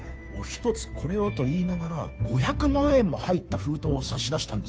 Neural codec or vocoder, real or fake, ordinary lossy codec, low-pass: codec, 16 kHz, 2 kbps, FunCodec, trained on Chinese and English, 25 frames a second; fake; none; none